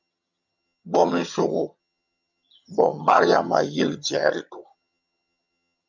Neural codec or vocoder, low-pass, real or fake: vocoder, 22.05 kHz, 80 mel bands, HiFi-GAN; 7.2 kHz; fake